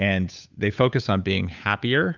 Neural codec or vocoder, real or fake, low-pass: codec, 16 kHz, 8 kbps, FunCodec, trained on Chinese and English, 25 frames a second; fake; 7.2 kHz